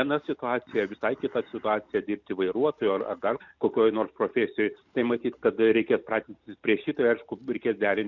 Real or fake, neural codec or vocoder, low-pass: real; none; 7.2 kHz